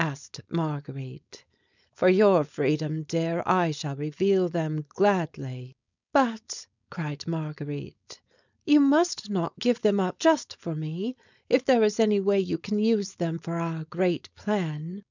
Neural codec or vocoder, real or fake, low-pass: codec, 16 kHz, 4.8 kbps, FACodec; fake; 7.2 kHz